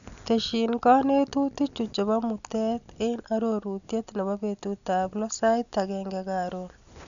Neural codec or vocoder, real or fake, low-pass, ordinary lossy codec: none; real; 7.2 kHz; none